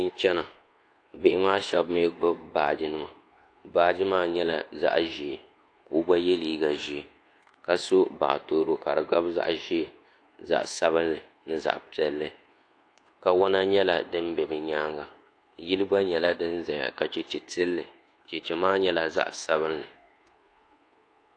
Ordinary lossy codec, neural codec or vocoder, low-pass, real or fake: AAC, 48 kbps; codec, 24 kHz, 1.2 kbps, DualCodec; 9.9 kHz; fake